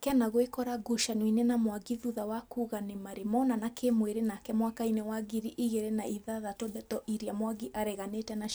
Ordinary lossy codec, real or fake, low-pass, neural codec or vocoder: none; real; none; none